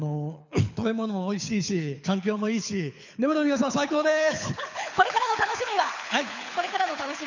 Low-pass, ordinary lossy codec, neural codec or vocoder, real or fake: 7.2 kHz; none; codec, 24 kHz, 6 kbps, HILCodec; fake